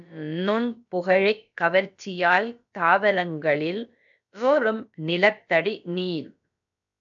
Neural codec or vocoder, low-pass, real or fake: codec, 16 kHz, about 1 kbps, DyCAST, with the encoder's durations; 7.2 kHz; fake